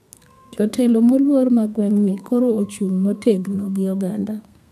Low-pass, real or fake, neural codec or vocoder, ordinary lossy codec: 14.4 kHz; fake; codec, 32 kHz, 1.9 kbps, SNAC; none